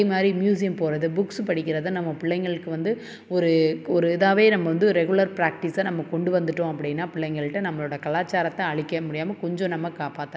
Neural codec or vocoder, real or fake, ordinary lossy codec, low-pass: none; real; none; none